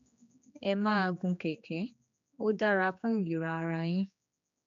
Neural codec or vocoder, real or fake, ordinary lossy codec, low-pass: codec, 16 kHz, 2 kbps, X-Codec, HuBERT features, trained on general audio; fake; AAC, 96 kbps; 7.2 kHz